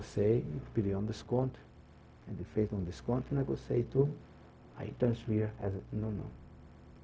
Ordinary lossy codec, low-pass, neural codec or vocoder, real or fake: none; none; codec, 16 kHz, 0.4 kbps, LongCat-Audio-Codec; fake